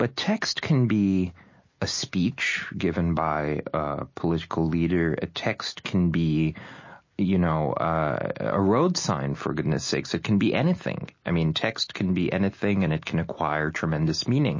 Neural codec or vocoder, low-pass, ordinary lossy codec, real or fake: none; 7.2 kHz; MP3, 32 kbps; real